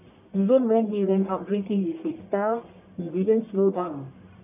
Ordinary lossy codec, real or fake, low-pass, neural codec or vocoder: none; fake; 3.6 kHz; codec, 44.1 kHz, 1.7 kbps, Pupu-Codec